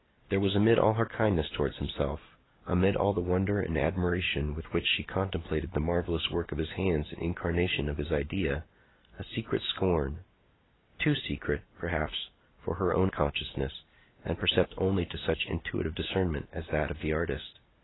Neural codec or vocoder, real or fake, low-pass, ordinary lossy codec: none; real; 7.2 kHz; AAC, 16 kbps